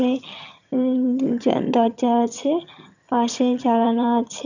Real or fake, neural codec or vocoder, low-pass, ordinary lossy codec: fake; vocoder, 22.05 kHz, 80 mel bands, HiFi-GAN; 7.2 kHz; none